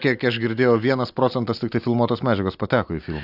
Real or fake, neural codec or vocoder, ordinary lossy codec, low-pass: real; none; AAC, 48 kbps; 5.4 kHz